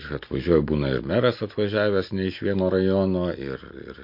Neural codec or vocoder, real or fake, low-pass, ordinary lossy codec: none; real; 5.4 kHz; MP3, 32 kbps